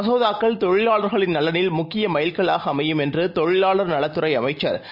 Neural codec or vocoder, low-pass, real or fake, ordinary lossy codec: none; 5.4 kHz; real; none